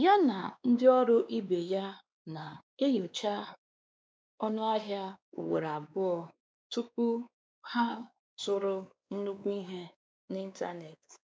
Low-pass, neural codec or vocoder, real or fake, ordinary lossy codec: none; codec, 16 kHz, 2 kbps, X-Codec, WavLM features, trained on Multilingual LibriSpeech; fake; none